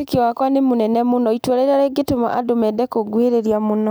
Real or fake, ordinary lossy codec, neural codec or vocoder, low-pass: real; none; none; none